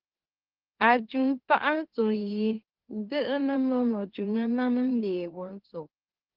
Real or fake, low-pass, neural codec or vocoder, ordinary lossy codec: fake; 5.4 kHz; autoencoder, 44.1 kHz, a latent of 192 numbers a frame, MeloTTS; Opus, 16 kbps